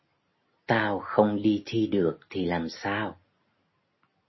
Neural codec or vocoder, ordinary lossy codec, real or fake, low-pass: none; MP3, 24 kbps; real; 7.2 kHz